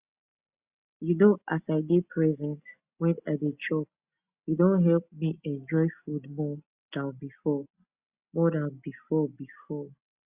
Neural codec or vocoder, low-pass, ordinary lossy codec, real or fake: none; 3.6 kHz; Opus, 64 kbps; real